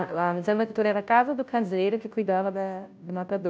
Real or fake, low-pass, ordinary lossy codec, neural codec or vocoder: fake; none; none; codec, 16 kHz, 0.5 kbps, FunCodec, trained on Chinese and English, 25 frames a second